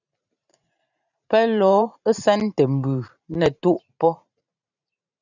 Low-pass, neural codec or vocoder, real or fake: 7.2 kHz; vocoder, 44.1 kHz, 128 mel bands every 512 samples, BigVGAN v2; fake